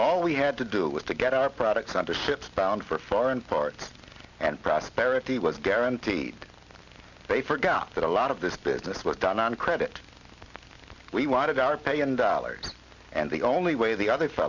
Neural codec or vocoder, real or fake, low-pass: none; real; 7.2 kHz